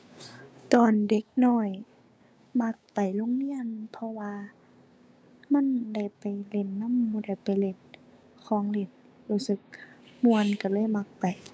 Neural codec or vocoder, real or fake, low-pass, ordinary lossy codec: codec, 16 kHz, 6 kbps, DAC; fake; none; none